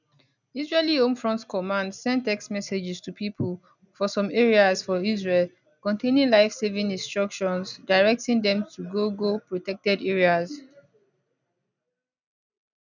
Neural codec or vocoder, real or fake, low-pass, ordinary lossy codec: none; real; 7.2 kHz; none